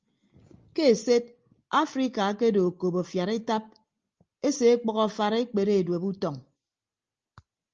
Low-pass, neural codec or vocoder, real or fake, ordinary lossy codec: 7.2 kHz; none; real; Opus, 24 kbps